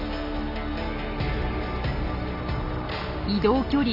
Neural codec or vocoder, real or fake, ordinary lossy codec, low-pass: none; real; none; 5.4 kHz